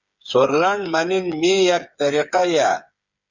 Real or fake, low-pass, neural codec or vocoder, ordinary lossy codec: fake; 7.2 kHz; codec, 16 kHz, 8 kbps, FreqCodec, smaller model; Opus, 64 kbps